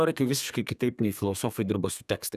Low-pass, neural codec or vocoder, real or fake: 14.4 kHz; codec, 32 kHz, 1.9 kbps, SNAC; fake